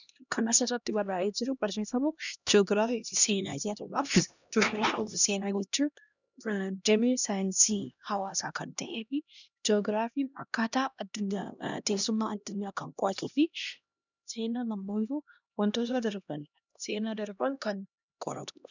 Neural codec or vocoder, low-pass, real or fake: codec, 16 kHz, 1 kbps, X-Codec, HuBERT features, trained on LibriSpeech; 7.2 kHz; fake